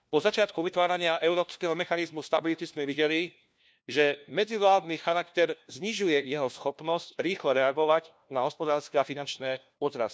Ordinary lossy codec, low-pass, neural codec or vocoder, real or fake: none; none; codec, 16 kHz, 1 kbps, FunCodec, trained on LibriTTS, 50 frames a second; fake